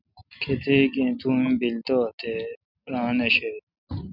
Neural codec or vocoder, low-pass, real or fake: none; 5.4 kHz; real